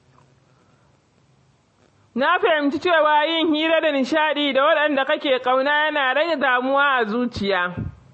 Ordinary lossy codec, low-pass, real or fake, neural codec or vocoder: MP3, 32 kbps; 10.8 kHz; real; none